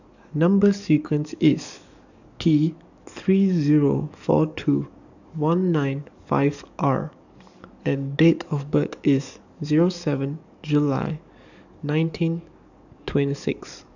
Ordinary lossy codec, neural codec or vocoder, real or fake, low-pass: none; codec, 44.1 kHz, 7.8 kbps, DAC; fake; 7.2 kHz